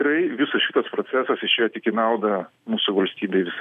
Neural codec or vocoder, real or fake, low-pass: none; real; 14.4 kHz